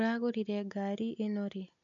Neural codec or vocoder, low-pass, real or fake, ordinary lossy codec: none; 7.2 kHz; real; MP3, 96 kbps